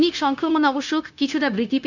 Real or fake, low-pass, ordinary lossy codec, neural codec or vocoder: fake; 7.2 kHz; none; codec, 16 kHz, 0.9 kbps, LongCat-Audio-Codec